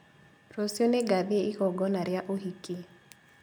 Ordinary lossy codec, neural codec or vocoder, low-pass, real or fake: none; none; none; real